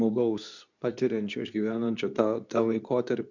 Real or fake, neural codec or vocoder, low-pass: fake; codec, 24 kHz, 0.9 kbps, WavTokenizer, medium speech release version 2; 7.2 kHz